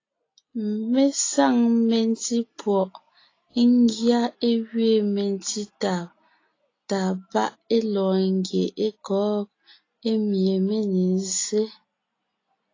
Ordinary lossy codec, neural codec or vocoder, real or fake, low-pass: AAC, 32 kbps; none; real; 7.2 kHz